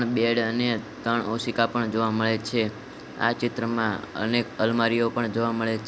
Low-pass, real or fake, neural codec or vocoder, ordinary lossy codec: none; real; none; none